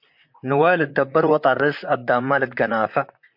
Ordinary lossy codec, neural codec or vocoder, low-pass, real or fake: MP3, 48 kbps; vocoder, 44.1 kHz, 128 mel bands, Pupu-Vocoder; 5.4 kHz; fake